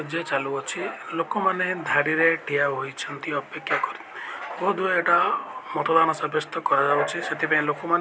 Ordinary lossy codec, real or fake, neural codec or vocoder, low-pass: none; real; none; none